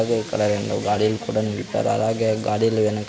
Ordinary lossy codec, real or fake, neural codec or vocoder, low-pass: none; real; none; none